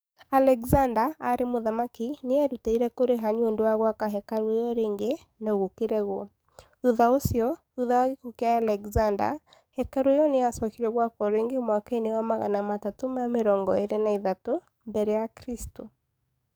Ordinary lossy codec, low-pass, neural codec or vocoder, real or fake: none; none; codec, 44.1 kHz, 7.8 kbps, DAC; fake